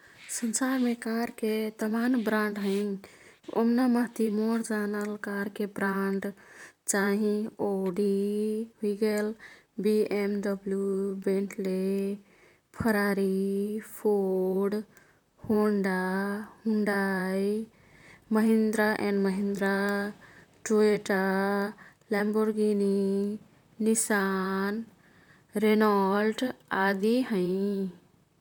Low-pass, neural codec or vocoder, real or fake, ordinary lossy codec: 19.8 kHz; vocoder, 44.1 kHz, 128 mel bands, Pupu-Vocoder; fake; none